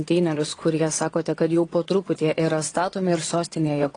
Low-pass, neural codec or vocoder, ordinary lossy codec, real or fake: 9.9 kHz; vocoder, 22.05 kHz, 80 mel bands, WaveNeXt; AAC, 32 kbps; fake